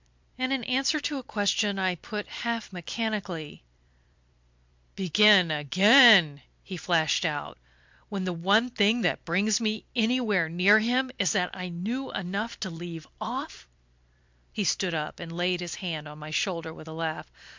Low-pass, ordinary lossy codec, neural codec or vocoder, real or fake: 7.2 kHz; MP3, 64 kbps; vocoder, 44.1 kHz, 128 mel bands every 256 samples, BigVGAN v2; fake